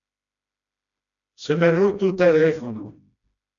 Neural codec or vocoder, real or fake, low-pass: codec, 16 kHz, 1 kbps, FreqCodec, smaller model; fake; 7.2 kHz